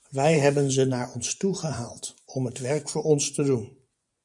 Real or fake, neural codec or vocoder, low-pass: fake; vocoder, 24 kHz, 100 mel bands, Vocos; 10.8 kHz